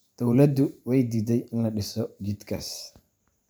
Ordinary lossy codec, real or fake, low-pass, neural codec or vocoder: none; fake; none; vocoder, 44.1 kHz, 128 mel bands every 512 samples, BigVGAN v2